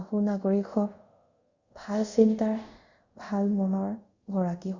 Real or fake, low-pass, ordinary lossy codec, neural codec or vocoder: fake; 7.2 kHz; Opus, 64 kbps; codec, 24 kHz, 0.5 kbps, DualCodec